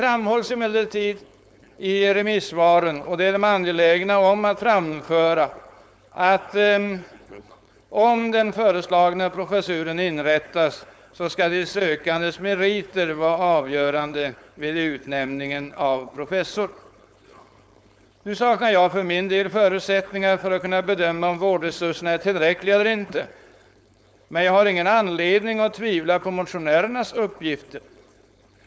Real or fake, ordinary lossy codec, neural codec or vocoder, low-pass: fake; none; codec, 16 kHz, 4.8 kbps, FACodec; none